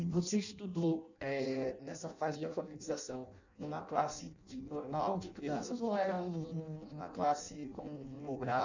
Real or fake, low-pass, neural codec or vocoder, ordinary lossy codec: fake; 7.2 kHz; codec, 16 kHz in and 24 kHz out, 0.6 kbps, FireRedTTS-2 codec; none